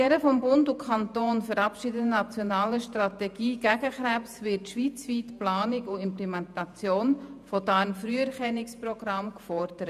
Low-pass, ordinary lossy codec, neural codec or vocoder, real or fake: 14.4 kHz; none; vocoder, 48 kHz, 128 mel bands, Vocos; fake